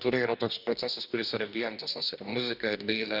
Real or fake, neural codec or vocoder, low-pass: fake; codec, 44.1 kHz, 2.6 kbps, DAC; 5.4 kHz